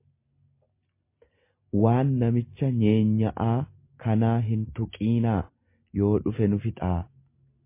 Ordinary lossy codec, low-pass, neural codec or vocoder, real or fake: MP3, 24 kbps; 3.6 kHz; none; real